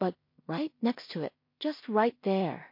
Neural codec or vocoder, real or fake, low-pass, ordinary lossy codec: codec, 16 kHz in and 24 kHz out, 0.4 kbps, LongCat-Audio-Codec, two codebook decoder; fake; 5.4 kHz; MP3, 32 kbps